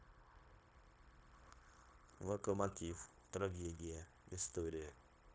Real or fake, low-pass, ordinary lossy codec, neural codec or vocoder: fake; none; none; codec, 16 kHz, 0.9 kbps, LongCat-Audio-Codec